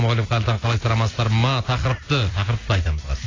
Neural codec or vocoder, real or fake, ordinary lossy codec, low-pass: none; real; AAC, 32 kbps; 7.2 kHz